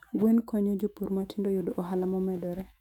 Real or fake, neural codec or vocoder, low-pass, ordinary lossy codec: fake; autoencoder, 48 kHz, 128 numbers a frame, DAC-VAE, trained on Japanese speech; 19.8 kHz; none